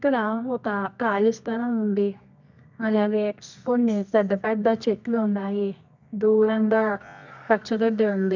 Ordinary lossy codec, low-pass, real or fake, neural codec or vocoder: none; 7.2 kHz; fake; codec, 24 kHz, 0.9 kbps, WavTokenizer, medium music audio release